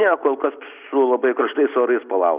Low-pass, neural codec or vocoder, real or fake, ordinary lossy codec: 3.6 kHz; none; real; Opus, 64 kbps